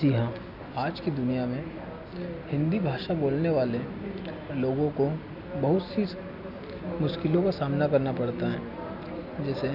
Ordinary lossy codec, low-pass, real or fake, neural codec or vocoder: none; 5.4 kHz; real; none